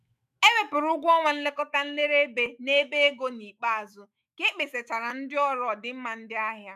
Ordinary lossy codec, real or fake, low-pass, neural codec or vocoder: none; fake; 14.4 kHz; autoencoder, 48 kHz, 128 numbers a frame, DAC-VAE, trained on Japanese speech